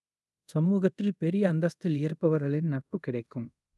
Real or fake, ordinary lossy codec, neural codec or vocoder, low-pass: fake; none; codec, 24 kHz, 0.5 kbps, DualCodec; none